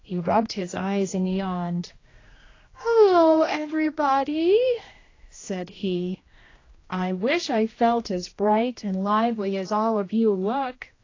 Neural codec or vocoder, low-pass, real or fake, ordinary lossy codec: codec, 16 kHz, 1 kbps, X-Codec, HuBERT features, trained on general audio; 7.2 kHz; fake; AAC, 32 kbps